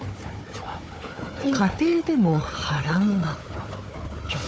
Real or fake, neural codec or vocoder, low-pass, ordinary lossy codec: fake; codec, 16 kHz, 4 kbps, FunCodec, trained on Chinese and English, 50 frames a second; none; none